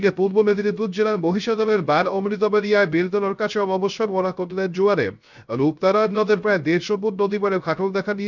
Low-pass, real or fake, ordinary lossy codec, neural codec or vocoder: 7.2 kHz; fake; none; codec, 16 kHz, 0.3 kbps, FocalCodec